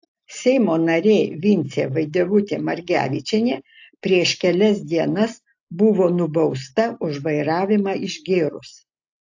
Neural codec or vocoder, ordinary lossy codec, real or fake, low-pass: none; AAC, 48 kbps; real; 7.2 kHz